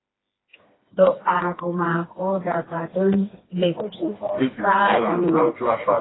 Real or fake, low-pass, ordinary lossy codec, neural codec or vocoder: fake; 7.2 kHz; AAC, 16 kbps; codec, 16 kHz, 2 kbps, FreqCodec, smaller model